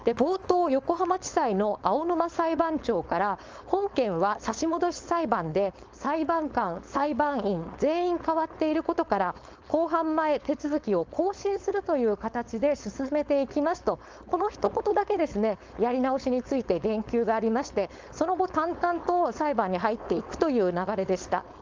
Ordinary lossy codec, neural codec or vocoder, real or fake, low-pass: Opus, 24 kbps; codec, 16 kHz, 4.8 kbps, FACodec; fake; 7.2 kHz